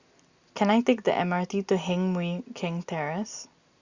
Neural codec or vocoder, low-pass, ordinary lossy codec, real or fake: none; 7.2 kHz; Opus, 64 kbps; real